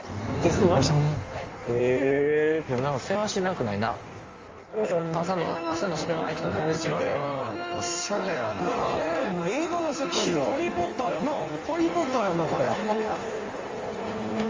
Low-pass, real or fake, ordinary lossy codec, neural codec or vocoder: 7.2 kHz; fake; Opus, 32 kbps; codec, 16 kHz in and 24 kHz out, 1.1 kbps, FireRedTTS-2 codec